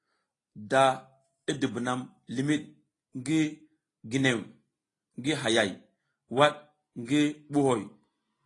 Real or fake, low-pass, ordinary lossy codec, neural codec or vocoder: real; 10.8 kHz; AAC, 32 kbps; none